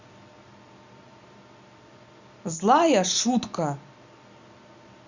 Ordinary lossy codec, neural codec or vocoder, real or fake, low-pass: Opus, 64 kbps; none; real; 7.2 kHz